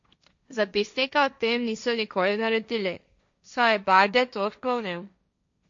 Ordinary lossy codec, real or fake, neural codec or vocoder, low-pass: MP3, 48 kbps; fake; codec, 16 kHz, 1.1 kbps, Voila-Tokenizer; 7.2 kHz